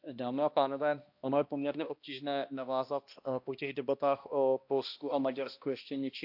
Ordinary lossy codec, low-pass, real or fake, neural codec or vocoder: AAC, 48 kbps; 5.4 kHz; fake; codec, 16 kHz, 1 kbps, X-Codec, HuBERT features, trained on balanced general audio